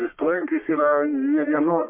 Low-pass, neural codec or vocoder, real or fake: 3.6 kHz; codec, 44.1 kHz, 1.7 kbps, Pupu-Codec; fake